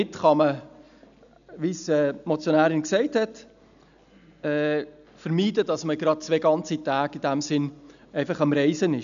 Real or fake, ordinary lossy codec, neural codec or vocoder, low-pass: real; none; none; 7.2 kHz